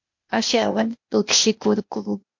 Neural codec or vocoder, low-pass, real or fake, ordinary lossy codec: codec, 16 kHz, 0.8 kbps, ZipCodec; 7.2 kHz; fake; MP3, 48 kbps